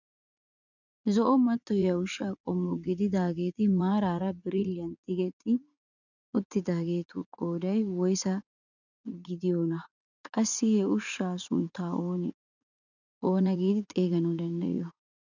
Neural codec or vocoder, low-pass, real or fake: vocoder, 44.1 kHz, 80 mel bands, Vocos; 7.2 kHz; fake